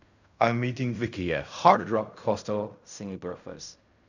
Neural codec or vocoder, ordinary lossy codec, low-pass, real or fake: codec, 16 kHz in and 24 kHz out, 0.4 kbps, LongCat-Audio-Codec, fine tuned four codebook decoder; none; 7.2 kHz; fake